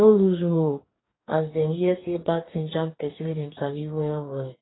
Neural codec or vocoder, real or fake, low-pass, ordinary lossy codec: codec, 44.1 kHz, 2.6 kbps, DAC; fake; 7.2 kHz; AAC, 16 kbps